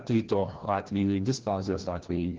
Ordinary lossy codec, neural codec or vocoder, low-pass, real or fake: Opus, 16 kbps; codec, 16 kHz, 1 kbps, FreqCodec, larger model; 7.2 kHz; fake